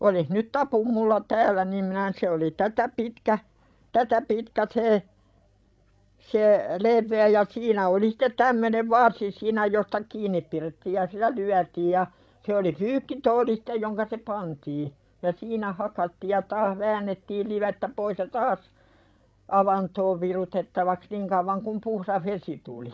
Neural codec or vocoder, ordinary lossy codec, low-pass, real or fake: codec, 16 kHz, 16 kbps, FreqCodec, larger model; none; none; fake